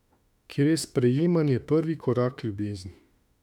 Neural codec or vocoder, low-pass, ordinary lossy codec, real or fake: autoencoder, 48 kHz, 32 numbers a frame, DAC-VAE, trained on Japanese speech; 19.8 kHz; none; fake